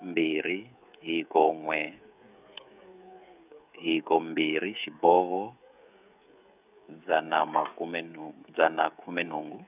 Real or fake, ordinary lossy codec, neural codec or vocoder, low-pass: fake; none; codec, 16 kHz, 16 kbps, FreqCodec, smaller model; 3.6 kHz